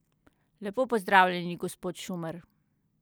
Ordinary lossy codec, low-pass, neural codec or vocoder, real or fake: none; none; none; real